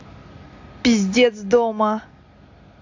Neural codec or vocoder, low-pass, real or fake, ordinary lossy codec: none; 7.2 kHz; real; AAC, 48 kbps